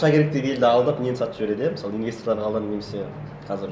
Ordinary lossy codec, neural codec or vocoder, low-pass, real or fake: none; none; none; real